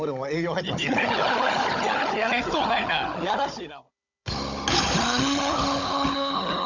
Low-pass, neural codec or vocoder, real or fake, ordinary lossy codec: 7.2 kHz; codec, 16 kHz, 16 kbps, FunCodec, trained on Chinese and English, 50 frames a second; fake; none